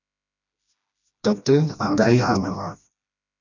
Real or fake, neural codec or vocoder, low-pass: fake; codec, 16 kHz, 1 kbps, FreqCodec, smaller model; 7.2 kHz